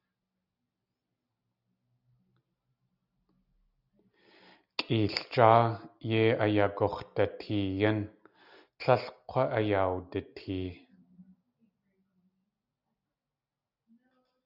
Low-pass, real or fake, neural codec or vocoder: 5.4 kHz; real; none